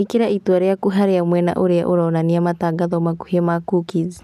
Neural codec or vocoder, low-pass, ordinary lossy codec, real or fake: none; 14.4 kHz; none; real